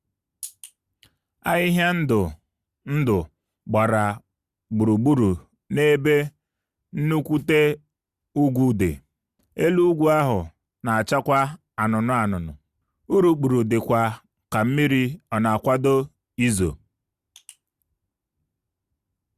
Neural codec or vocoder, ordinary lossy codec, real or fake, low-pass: none; Opus, 64 kbps; real; 14.4 kHz